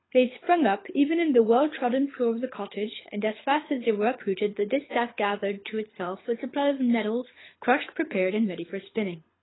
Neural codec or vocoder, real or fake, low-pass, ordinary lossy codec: codec, 24 kHz, 6 kbps, HILCodec; fake; 7.2 kHz; AAC, 16 kbps